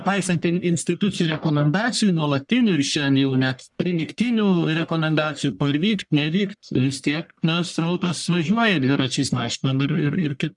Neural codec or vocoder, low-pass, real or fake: codec, 44.1 kHz, 1.7 kbps, Pupu-Codec; 10.8 kHz; fake